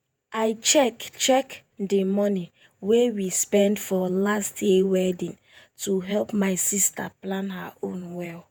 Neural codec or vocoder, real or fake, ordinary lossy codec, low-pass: vocoder, 48 kHz, 128 mel bands, Vocos; fake; none; none